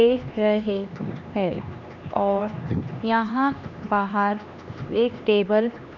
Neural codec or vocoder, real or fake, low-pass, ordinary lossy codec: codec, 16 kHz, 2 kbps, X-Codec, HuBERT features, trained on LibriSpeech; fake; 7.2 kHz; none